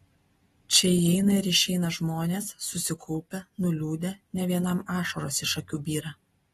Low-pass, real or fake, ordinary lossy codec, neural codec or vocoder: 19.8 kHz; real; AAC, 32 kbps; none